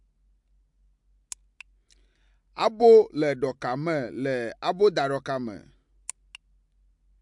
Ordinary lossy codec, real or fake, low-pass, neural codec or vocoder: MP3, 64 kbps; real; 10.8 kHz; none